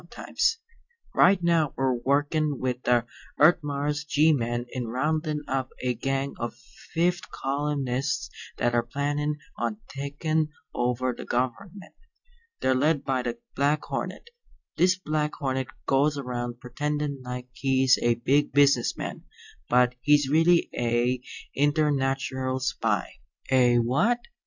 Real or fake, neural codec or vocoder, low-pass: real; none; 7.2 kHz